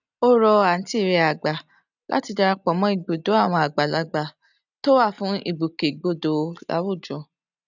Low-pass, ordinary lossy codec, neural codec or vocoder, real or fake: 7.2 kHz; none; none; real